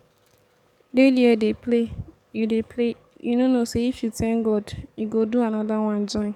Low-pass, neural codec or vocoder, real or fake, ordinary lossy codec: 19.8 kHz; codec, 44.1 kHz, 7.8 kbps, DAC; fake; none